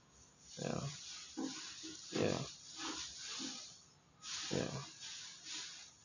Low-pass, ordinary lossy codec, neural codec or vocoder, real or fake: 7.2 kHz; none; none; real